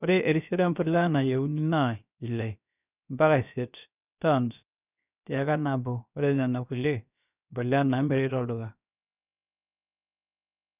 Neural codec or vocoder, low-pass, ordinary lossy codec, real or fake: codec, 16 kHz, about 1 kbps, DyCAST, with the encoder's durations; 3.6 kHz; none; fake